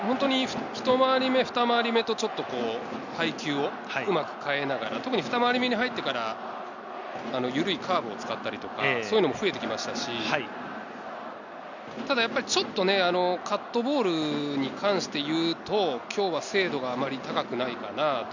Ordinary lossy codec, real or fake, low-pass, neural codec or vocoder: none; real; 7.2 kHz; none